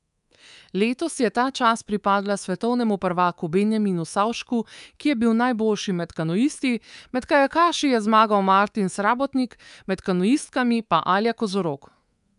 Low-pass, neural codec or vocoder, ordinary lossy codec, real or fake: 10.8 kHz; codec, 24 kHz, 3.1 kbps, DualCodec; none; fake